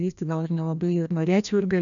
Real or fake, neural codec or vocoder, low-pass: fake; codec, 16 kHz, 1 kbps, FreqCodec, larger model; 7.2 kHz